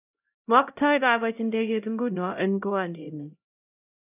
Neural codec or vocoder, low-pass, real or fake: codec, 16 kHz, 0.5 kbps, X-Codec, HuBERT features, trained on LibriSpeech; 3.6 kHz; fake